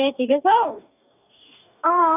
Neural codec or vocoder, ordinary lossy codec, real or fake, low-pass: codec, 44.1 kHz, 2.6 kbps, DAC; none; fake; 3.6 kHz